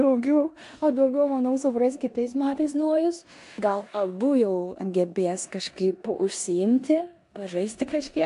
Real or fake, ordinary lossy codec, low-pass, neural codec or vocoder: fake; AAC, 64 kbps; 10.8 kHz; codec, 16 kHz in and 24 kHz out, 0.9 kbps, LongCat-Audio-Codec, four codebook decoder